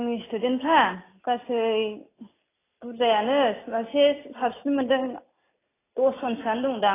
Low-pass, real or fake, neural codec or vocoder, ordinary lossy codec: 3.6 kHz; real; none; AAC, 16 kbps